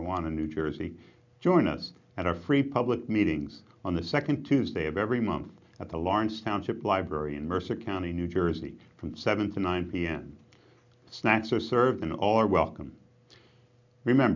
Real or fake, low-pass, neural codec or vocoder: real; 7.2 kHz; none